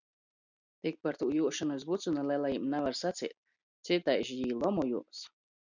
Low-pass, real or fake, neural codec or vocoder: 7.2 kHz; real; none